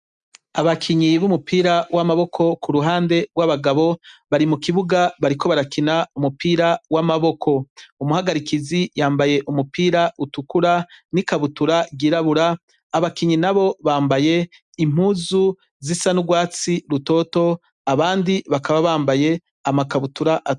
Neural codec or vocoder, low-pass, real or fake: none; 10.8 kHz; real